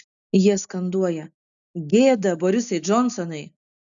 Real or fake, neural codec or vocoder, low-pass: real; none; 7.2 kHz